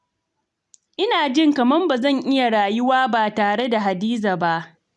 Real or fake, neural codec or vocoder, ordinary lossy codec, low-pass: real; none; none; 10.8 kHz